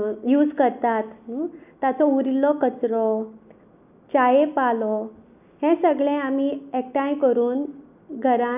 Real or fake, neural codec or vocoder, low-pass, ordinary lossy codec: real; none; 3.6 kHz; none